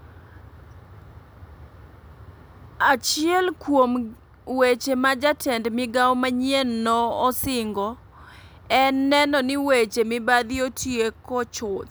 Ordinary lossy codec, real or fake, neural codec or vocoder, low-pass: none; real; none; none